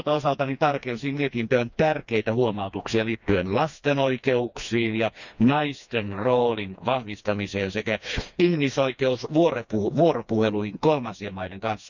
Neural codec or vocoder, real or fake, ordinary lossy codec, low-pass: codec, 16 kHz, 2 kbps, FreqCodec, smaller model; fake; none; 7.2 kHz